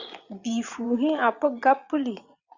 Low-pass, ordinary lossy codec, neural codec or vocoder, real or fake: 7.2 kHz; Opus, 64 kbps; vocoder, 22.05 kHz, 80 mel bands, Vocos; fake